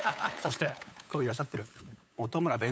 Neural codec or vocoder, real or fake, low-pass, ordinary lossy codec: codec, 16 kHz, 8 kbps, FunCodec, trained on LibriTTS, 25 frames a second; fake; none; none